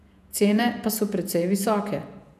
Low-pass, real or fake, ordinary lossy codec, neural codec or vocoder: 14.4 kHz; fake; none; vocoder, 48 kHz, 128 mel bands, Vocos